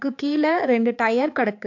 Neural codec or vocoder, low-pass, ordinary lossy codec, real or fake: codec, 16 kHz, 4 kbps, X-Codec, HuBERT features, trained on balanced general audio; 7.2 kHz; AAC, 48 kbps; fake